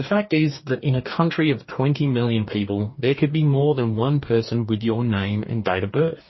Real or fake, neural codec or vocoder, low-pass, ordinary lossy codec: fake; codec, 44.1 kHz, 2.6 kbps, DAC; 7.2 kHz; MP3, 24 kbps